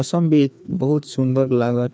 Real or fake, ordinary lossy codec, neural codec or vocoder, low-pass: fake; none; codec, 16 kHz, 2 kbps, FreqCodec, larger model; none